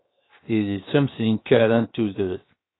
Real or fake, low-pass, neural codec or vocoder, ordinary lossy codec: fake; 7.2 kHz; codec, 16 kHz, 0.7 kbps, FocalCodec; AAC, 16 kbps